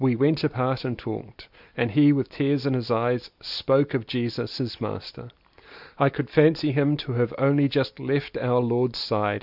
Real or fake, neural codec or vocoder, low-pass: real; none; 5.4 kHz